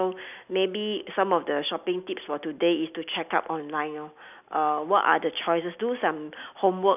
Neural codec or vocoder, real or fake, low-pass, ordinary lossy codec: none; real; 3.6 kHz; none